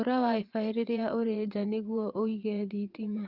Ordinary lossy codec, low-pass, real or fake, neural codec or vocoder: Opus, 32 kbps; 5.4 kHz; fake; vocoder, 22.05 kHz, 80 mel bands, WaveNeXt